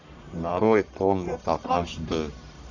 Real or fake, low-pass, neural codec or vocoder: fake; 7.2 kHz; codec, 44.1 kHz, 1.7 kbps, Pupu-Codec